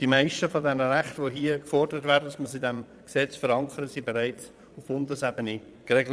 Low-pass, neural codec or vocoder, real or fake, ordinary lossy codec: none; vocoder, 22.05 kHz, 80 mel bands, Vocos; fake; none